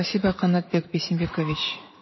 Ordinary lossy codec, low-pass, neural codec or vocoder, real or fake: MP3, 24 kbps; 7.2 kHz; vocoder, 44.1 kHz, 80 mel bands, Vocos; fake